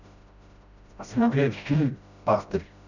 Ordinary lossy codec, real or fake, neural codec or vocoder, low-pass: none; fake; codec, 16 kHz, 0.5 kbps, FreqCodec, smaller model; 7.2 kHz